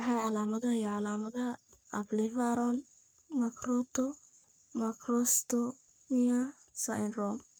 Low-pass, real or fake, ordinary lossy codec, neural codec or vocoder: none; fake; none; codec, 44.1 kHz, 3.4 kbps, Pupu-Codec